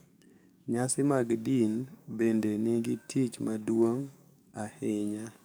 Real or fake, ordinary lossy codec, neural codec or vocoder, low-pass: fake; none; codec, 44.1 kHz, 7.8 kbps, DAC; none